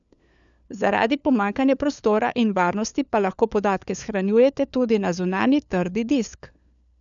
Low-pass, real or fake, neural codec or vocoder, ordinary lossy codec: 7.2 kHz; fake; codec, 16 kHz, 4 kbps, FunCodec, trained on LibriTTS, 50 frames a second; none